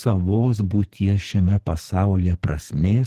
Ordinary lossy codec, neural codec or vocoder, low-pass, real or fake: Opus, 16 kbps; codec, 44.1 kHz, 2.6 kbps, SNAC; 14.4 kHz; fake